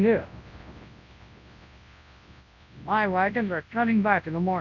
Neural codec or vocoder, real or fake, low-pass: codec, 24 kHz, 0.9 kbps, WavTokenizer, large speech release; fake; 7.2 kHz